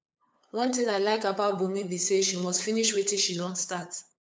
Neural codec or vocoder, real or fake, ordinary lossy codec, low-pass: codec, 16 kHz, 8 kbps, FunCodec, trained on LibriTTS, 25 frames a second; fake; none; none